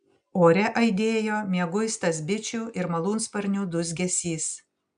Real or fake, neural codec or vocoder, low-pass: real; none; 9.9 kHz